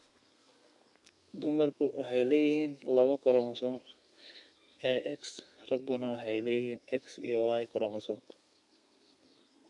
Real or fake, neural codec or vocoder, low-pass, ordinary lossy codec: fake; codec, 32 kHz, 1.9 kbps, SNAC; 10.8 kHz; none